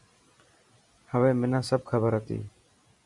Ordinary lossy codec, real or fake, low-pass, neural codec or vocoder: Opus, 64 kbps; real; 10.8 kHz; none